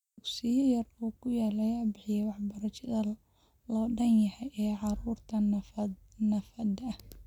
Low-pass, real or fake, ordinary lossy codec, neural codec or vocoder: 19.8 kHz; real; none; none